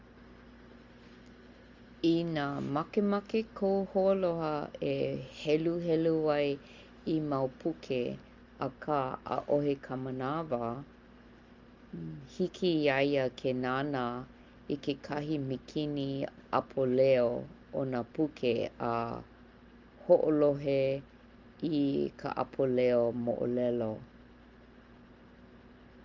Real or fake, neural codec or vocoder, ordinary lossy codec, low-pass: real; none; Opus, 32 kbps; 7.2 kHz